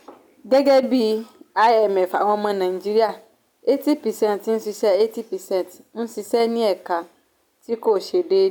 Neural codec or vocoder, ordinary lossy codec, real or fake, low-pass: none; none; real; 19.8 kHz